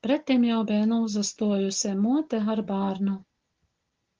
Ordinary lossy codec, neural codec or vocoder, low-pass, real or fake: Opus, 16 kbps; none; 7.2 kHz; real